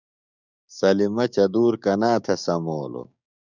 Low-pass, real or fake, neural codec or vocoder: 7.2 kHz; fake; codec, 16 kHz, 6 kbps, DAC